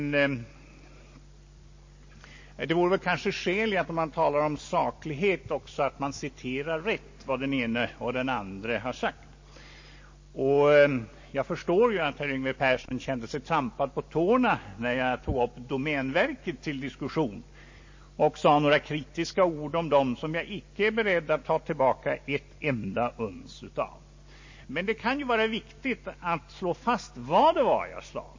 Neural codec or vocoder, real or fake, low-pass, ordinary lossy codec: none; real; 7.2 kHz; MP3, 32 kbps